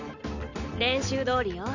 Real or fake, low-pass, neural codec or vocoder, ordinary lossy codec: real; 7.2 kHz; none; none